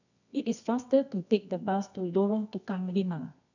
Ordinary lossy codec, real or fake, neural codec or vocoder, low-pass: none; fake; codec, 24 kHz, 0.9 kbps, WavTokenizer, medium music audio release; 7.2 kHz